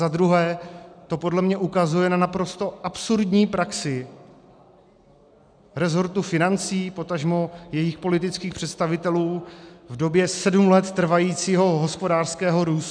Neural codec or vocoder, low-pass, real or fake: none; 9.9 kHz; real